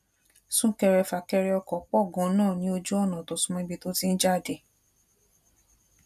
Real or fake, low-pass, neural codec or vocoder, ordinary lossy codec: real; 14.4 kHz; none; none